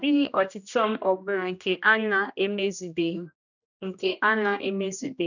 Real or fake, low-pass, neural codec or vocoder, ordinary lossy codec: fake; 7.2 kHz; codec, 16 kHz, 1 kbps, X-Codec, HuBERT features, trained on general audio; none